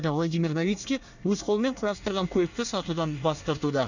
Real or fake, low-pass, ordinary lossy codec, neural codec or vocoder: fake; 7.2 kHz; none; codec, 24 kHz, 1 kbps, SNAC